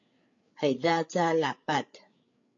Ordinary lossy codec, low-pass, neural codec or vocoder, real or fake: AAC, 48 kbps; 7.2 kHz; codec, 16 kHz, 8 kbps, FreqCodec, larger model; fake